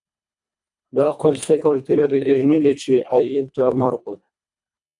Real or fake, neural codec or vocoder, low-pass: fake; codec, 24 kHz, 1.5 kbps, HILCodec; 10.8 kHz